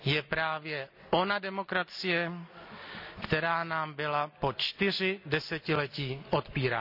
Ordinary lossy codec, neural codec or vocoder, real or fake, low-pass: none; none; real; 5.4 kHz